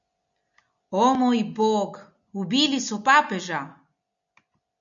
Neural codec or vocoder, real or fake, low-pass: none; real; 7.2 kHz